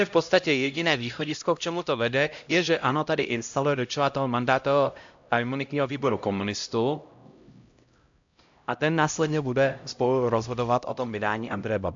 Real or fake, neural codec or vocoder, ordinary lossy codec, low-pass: fake; codec, 16 kHz, 0.5 kbps, X-Codec, HuBERT features, trained on LibriSpeech; AAC, 64 kbps; 7.2 kHz